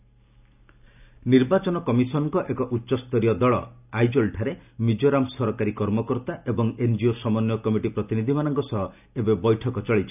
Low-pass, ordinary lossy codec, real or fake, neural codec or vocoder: 3.6 kHz; none; real; none